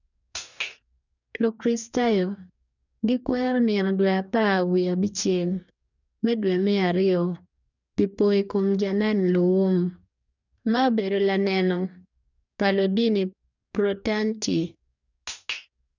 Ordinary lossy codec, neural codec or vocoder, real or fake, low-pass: none; codec, 44.1 kHz, 2.6 kbps, DAC; fake; 7.2 kHz